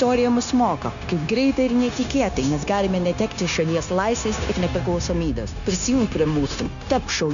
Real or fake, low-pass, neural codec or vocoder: fake; 7.2 kHz; codec, 16 kHz, 0.9 kbps, LongCat-Audio-Codec